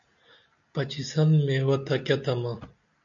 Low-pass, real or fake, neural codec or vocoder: 7.2 kHz; real; none